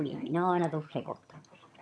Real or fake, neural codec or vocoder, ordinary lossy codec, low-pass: fake; vocoder, 22.05 kHz, 80 mel bands, HiFi-GAN; none; none